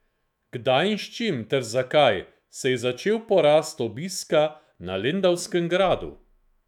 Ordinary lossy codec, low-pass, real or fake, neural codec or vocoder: none; 19.8 kHz; fake; autoencoder, 48 kHz, 128 numbers a frame, DAC-VAE, trained on Japanese speech